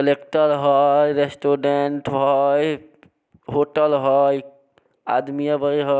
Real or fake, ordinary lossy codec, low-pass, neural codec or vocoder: real; none; none; none